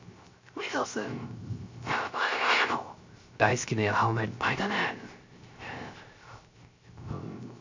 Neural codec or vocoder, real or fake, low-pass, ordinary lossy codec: codec, 16 kHz, 0.3 kbps, FocalCodec; fake; 7.2 kHz; MP3, 64 kbps